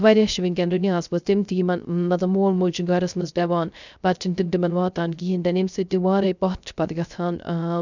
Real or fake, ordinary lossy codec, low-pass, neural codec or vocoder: fake; none; 7.2 kHz; codec, 16 kHz, 0.3 kbps, FocalCodec